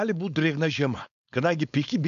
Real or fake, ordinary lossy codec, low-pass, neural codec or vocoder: fake; MP3, 96 kbps; 7.2 kHz; codec, 16 kHz, 4.8 kbps, FACodec